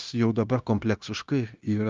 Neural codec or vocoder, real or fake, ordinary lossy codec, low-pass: codec, 16 kHz, 0.9 kbps, LongCat-Audio-Codec; fake; Opus, 24 kbps; 7.2 kHz